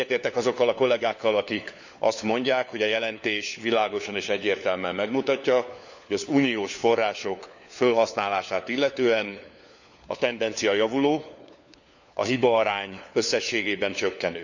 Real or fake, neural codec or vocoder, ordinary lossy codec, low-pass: fake; codec, 16 kHz, 4 kbps, FunCodec, trained on LibriTTS, 50 frames a second; none; 7.2 kHz